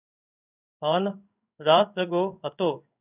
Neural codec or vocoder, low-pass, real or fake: codec, 16 kHz in and 24 kHz out, 1 kbps, XY-Tokenizer; 3.6 kHz; fake